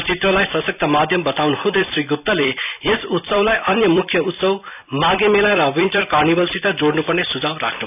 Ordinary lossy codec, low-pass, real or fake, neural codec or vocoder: none; 3.6 kHz; fake; vocoder, 44.1 kHz, 128 mel bands every 256 samples, BigVGAN v2